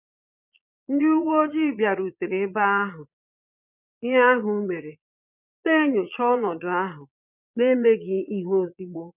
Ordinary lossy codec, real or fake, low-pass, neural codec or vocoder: none; fake; 3.6 kHz; vocoder, 22.05 kHz, 80 mel bands, Vocos